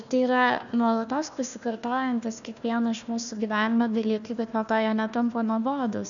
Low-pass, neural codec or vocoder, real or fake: 7.2 kHz; codec, 16 kHz, 1 kbps, FunCodec, trained on Chinese and English, 50 frames a second; fake